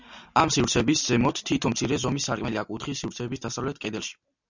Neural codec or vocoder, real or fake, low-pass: none; real; 7.2 kHz